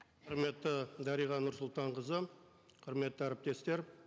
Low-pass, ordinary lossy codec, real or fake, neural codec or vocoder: none; none; real; none